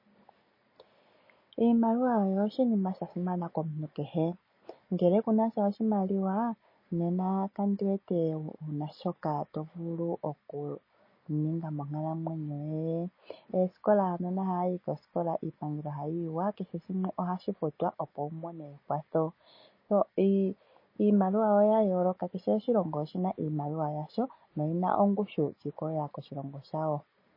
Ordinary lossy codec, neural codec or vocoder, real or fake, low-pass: MP3, 24 kbps; none; real; 5.4 kHz